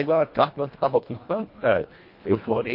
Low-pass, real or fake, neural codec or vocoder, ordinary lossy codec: 5.4 kHz; fake; codec, 24 kHz, 1.5 kbps, HILCodec; MP3, 32 kbps